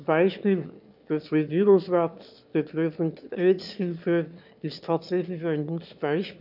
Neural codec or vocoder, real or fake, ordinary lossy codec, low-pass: autoencoder, 22.05 kHz, a latent of 192 numbers a frame, VITS, trained on one speaker; fake; none; 5.4 kHz